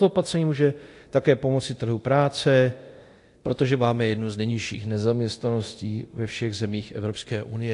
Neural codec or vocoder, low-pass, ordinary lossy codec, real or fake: codec, 24 kHz, 0.9 kbps, DualCodec; 10.8 kHz; AAC, 48 kbps; fake